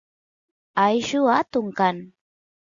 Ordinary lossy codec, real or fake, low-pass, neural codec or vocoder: Opus, 64 kbps; real; 7.2 kHz; none